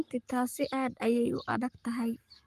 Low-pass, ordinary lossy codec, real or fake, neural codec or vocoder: 14.4 kHz; Opus, 32 kbps; fake; codec, 44.1 kHz, 7.8 kbps, Pupu-Codec